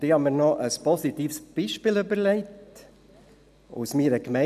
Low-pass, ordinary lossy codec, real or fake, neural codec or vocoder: 14.4 kHz; none; real; none